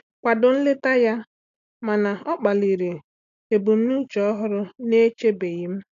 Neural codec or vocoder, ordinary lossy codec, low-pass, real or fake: none; none; 7.2 kHz; real